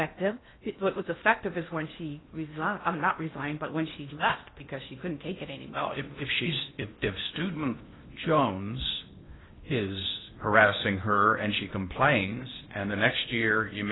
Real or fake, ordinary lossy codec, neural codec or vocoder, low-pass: fake; AAC, 16 kbps; codec, 16 kHz in and 24 kHz out, 0.8 kbps, FocalCodec, streaming, 65536 codes; 7.2 kHz